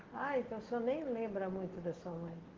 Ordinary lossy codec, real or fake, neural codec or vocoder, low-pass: Opus, 16 kbps; real; none; 7.2 kHz